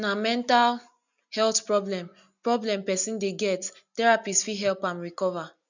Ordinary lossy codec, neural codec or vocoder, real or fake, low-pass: none; none; real; 7.2 kHz